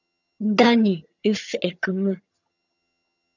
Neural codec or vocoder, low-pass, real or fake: vocoder, 22.05 kHz, 80 mel bands, HiFi-GAN; 7.2 kHz; fake